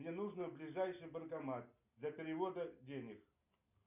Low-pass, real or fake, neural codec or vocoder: 3.6 kHz; real; none